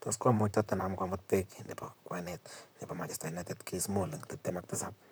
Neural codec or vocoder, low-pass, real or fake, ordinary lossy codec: vocoder, 44.1 kHz, 128 mel bands, Pupu-Vocoder; none; fake; none